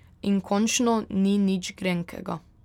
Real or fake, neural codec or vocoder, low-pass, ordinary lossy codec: real; none; 19.8 kHz; none